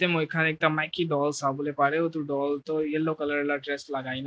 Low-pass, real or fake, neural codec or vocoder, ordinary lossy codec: none; real; none; none